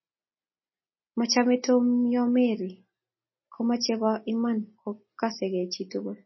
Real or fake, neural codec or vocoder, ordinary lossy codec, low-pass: real; none; MP3, 24 kbps; 7.2 kHz